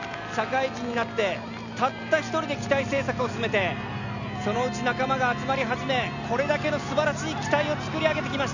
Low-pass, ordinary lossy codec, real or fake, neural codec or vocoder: 7.2 kHz; none; real; none